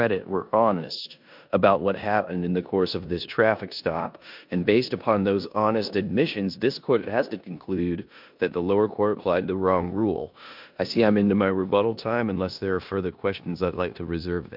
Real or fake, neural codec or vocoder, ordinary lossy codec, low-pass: fake; codec, 16 kHz in and 24 kHz out, 0.9 kbps, LongCat-Audio-Codec, four codebook decoder; MP3, 48 kbps; 5.4 kHz